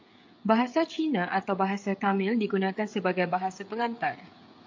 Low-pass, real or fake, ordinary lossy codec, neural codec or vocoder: 7.2 kHz; fake; AAC, 48 kbps; codec, 16 kHz, 16 kbps, FreqCodec, smaller model